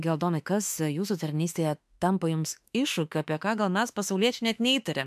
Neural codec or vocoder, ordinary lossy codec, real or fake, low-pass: autoencoder, 48 kHz, 32 numbers a frame, DAC-VAE, trained on Japanese speech; MP3, 96 kbps; fake; 14.4 kHz